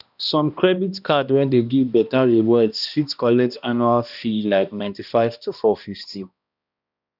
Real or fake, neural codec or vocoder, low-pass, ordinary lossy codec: fake; codec, 16 kHz, 1 kbps, X-Codec, HuBERT features, trained on balanced general audio; 5.4 kHz; none